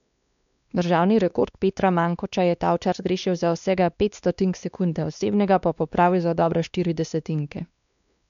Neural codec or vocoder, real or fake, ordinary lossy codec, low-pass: codec, 16 kHz, 2 kbps, X-Codec, WavLM features, trained on Multilingual LibriSpeech; fake; none; 7.2 kHz